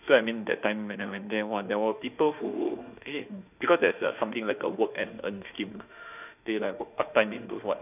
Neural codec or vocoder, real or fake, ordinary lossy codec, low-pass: autoencoder, 48 kHz, 32 numbers a frame, DAC-VAE, trained on Japanese speech; fake; none; 3.6 kHz